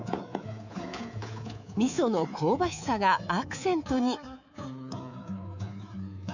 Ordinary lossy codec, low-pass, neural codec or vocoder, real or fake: none; 7.2 kHz; codec, 24 kHz, 3.1 kbps, DualCodec; fake